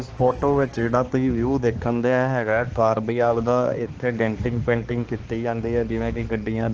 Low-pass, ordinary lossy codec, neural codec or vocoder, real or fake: 7.2 kHz; Opus, 16 kbps; codec, 16 kHz, 2 kbps, X-Codec, HuBERT features, trained on balanced general audio; fake